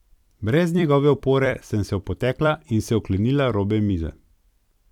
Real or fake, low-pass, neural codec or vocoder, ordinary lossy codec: fake; 19.8 kHz; vocoder, 44.1 kHz, 128 mel bands every 256 samples, BigVGAN v2; none